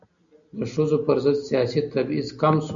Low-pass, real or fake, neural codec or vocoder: 7.2 kHz; real; none